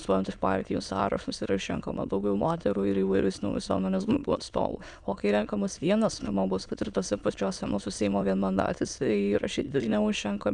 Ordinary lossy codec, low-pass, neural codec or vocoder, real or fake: MP3, 96 kbps; 9.9 kHz; autoencoder, 22.05 kHz, a latent of 192 numbers a frame, VITS, trained on many speakers; fake